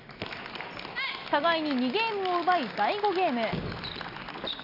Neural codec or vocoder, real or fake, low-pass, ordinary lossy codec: none; real; 5.4 kHz; none